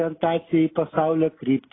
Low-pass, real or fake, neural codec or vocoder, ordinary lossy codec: 7.2 kHz; real; none; AAC, 16 kbps